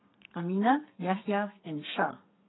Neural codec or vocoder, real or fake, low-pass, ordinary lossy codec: codec, 32 kHz, 1.9 kbps, SNAC; fake; 7.2 kHz; AAC, 16 kbps